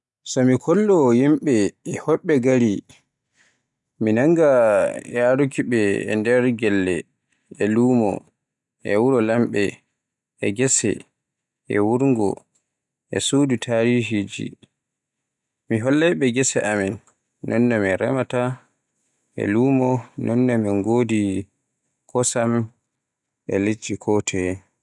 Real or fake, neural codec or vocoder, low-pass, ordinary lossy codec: real; none; 10.8 kHz; none